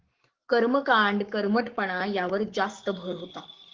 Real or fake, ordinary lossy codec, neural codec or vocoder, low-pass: fake; Opus, 16 kbps; codec, 44.1 kHz, 7.8 kbps, Pupu-Codec; 7.2 kHz